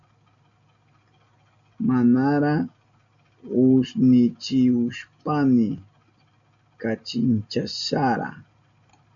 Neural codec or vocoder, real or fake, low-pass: none; real; 7.2 kHz